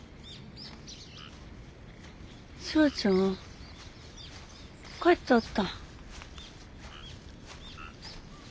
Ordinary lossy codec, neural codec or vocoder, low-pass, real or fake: none; none; none; real